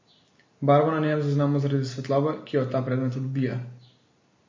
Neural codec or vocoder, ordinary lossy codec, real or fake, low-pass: none; MP3, 32 kbps; real; 7.2 kHz